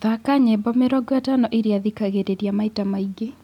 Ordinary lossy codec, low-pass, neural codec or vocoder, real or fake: none; 19.8 kHz; none; real